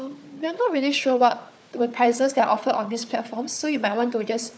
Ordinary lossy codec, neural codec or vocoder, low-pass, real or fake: none; codec, 16 kHz, 4 kbps, FunCodec, trained on Chinese and English, 50 frames a second; none; fake